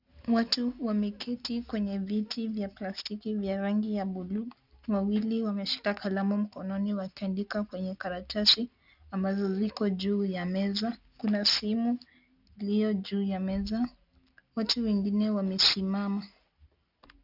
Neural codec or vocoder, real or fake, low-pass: none; real; 5.4 kHz